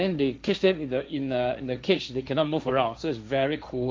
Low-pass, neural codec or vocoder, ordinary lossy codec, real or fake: none; codec, 16 kHz, 1.1 kbps, Voila-Tokenizer; none; fake